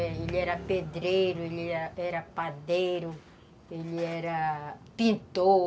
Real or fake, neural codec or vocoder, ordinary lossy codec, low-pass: real; none; none; none